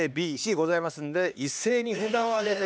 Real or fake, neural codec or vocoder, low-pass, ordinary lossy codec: fake; codec, 16 kHz, 2 kbps, X-Codec, HuBERT features, trained on LibriSpeech; none; none